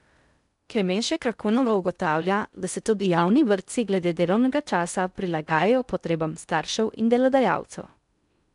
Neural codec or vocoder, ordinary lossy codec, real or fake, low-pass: codec, 16 kHz in and 24 kHz out, 0.6 kbps, FocalCodec, streaming, 2048 codes; none; fake; 10.8 kHz